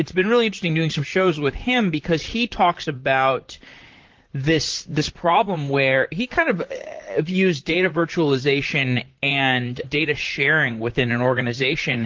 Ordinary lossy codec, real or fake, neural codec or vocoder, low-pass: Opus, 16 kbps; fake; vocoder, 44.1 kHz, 128 mel bands, Pupu-Vocoder; 7.2 kHz